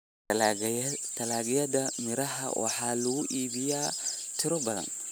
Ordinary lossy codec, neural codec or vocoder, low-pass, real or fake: none; none; none; real